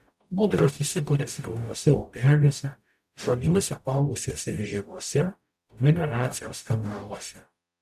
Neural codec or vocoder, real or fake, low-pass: codec, 44.1 kHz, 0.9 kbps, DAC; fake; 14.4 kHz